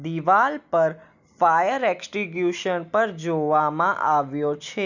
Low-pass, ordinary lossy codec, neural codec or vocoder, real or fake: 7.2 kHz; none; none; real